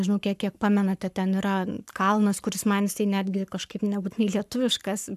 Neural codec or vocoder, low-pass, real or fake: codec, 44.1 kHz, 7.8 kbps, Pupu-Codec; 14.4 kHz; fake